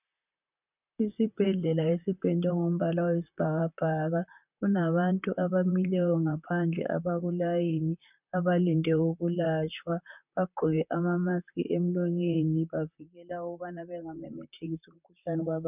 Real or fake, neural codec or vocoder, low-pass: fake; vocoder, 44.1 kHz, 128 mel bands, Pupu-Vocoder; 3.6 kHz